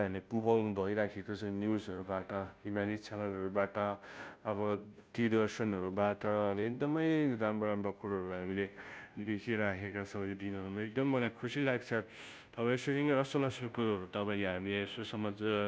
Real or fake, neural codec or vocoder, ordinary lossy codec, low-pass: fake; codec, 16 kHz, 0.5 kbps, FunCodec, trained on Chinese and English, 25 frames a second; none; none